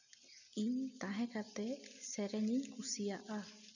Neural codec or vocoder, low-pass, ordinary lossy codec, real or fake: none; 7.2 kHz; none; real